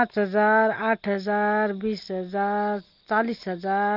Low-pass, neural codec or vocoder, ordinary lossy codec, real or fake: 5.4 kHz; none; Opus, 32 kbps; real